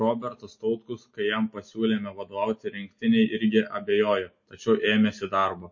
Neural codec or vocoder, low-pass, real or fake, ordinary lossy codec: none; 7.2 kHz; real; MP3, 32 kbps